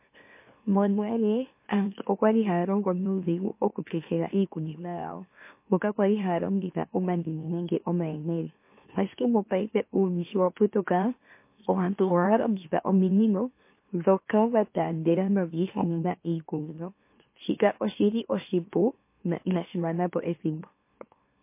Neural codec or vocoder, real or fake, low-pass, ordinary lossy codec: autoencoder, 44.1 kHz, a latent of 192 numbers a frame, MeloTTS; fake; 3.6 kHz; MP3, 24 kbps